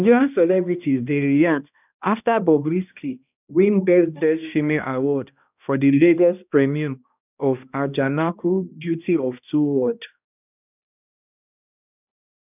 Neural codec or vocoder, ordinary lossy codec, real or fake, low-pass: codec, 16 kHz, 1 kbps, X-Codec, HuBERT features, trained on balanced general audio; none; fake; 3.6 kHz